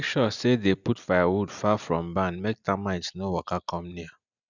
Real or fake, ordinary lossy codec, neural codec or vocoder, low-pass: real; none; none; 7.2 kHz